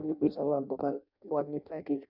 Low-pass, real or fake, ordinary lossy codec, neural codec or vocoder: 5.4 kHz; fake; none; codec, 16 kHz in and 24 kHz out, 0.6 kbps, FireRedTTS-2 codec